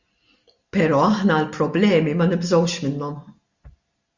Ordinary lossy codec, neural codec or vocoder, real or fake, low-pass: Opus, 64 kbps; none; real; 7.2 kHz